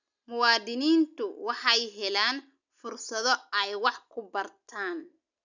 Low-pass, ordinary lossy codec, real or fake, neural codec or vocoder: 7.2 kHz; none; real; none